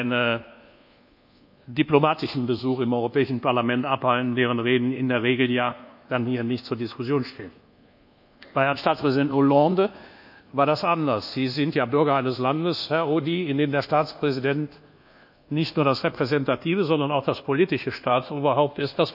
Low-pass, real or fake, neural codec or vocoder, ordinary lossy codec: 5.4 kHz; fake; codec, 24 kHz, 1.2 kbps, DualCodec; none